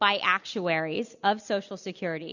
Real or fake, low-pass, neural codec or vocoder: real; 7.2 kHz; none